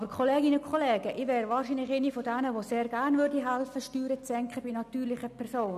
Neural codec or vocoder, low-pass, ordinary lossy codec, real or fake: none; 14.4 kHz; none; real